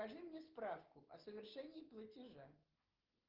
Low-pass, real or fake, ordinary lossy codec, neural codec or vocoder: 5.4 kHz; real; Opus, 16 kbps; none